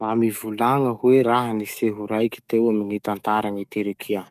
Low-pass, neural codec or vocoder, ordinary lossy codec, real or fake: 14.4 kHz; codec, 44.1 kHz, 7.8 kbps, DAC; none; fake